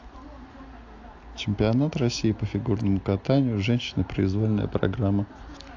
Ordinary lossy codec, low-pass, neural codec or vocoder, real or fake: AAC, 48 kbps; 7.2 kHz; none; real